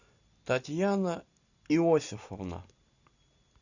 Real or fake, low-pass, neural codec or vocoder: real; 7.2 kHz; none